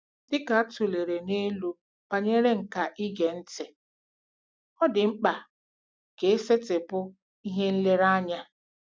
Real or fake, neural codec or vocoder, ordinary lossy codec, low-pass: real; none; none; none